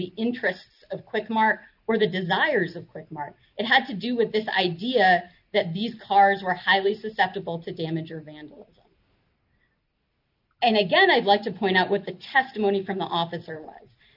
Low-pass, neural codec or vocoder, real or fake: 5.4 kHz; none; real